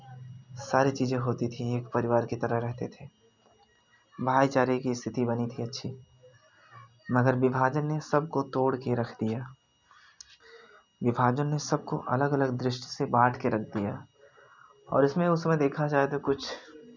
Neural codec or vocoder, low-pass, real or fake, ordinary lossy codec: none; 7.2 kHz; real; none